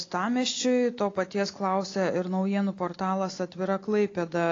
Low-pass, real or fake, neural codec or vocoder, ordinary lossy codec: 7.2 kHz; real; none; AAC, 32 kbps